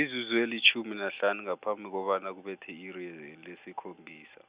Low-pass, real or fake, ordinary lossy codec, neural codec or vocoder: 3.6 kHz; real; Opus, 64 kbps; none